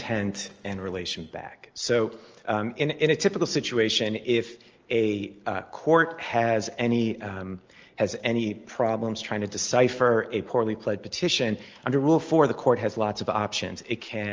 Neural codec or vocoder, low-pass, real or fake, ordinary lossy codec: none; 7.2 kHz; real; Opus, 24 kbps